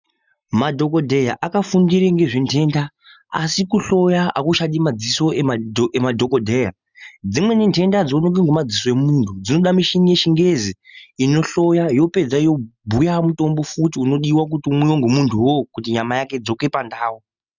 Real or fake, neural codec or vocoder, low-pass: real; none; 7.2 kHz